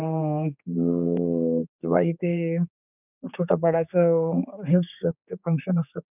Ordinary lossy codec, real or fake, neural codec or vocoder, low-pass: none; fake; codec, 16 kHz, 4 kbps, X-Codec, HuBERT features, trained on general audio; 3.6 kHz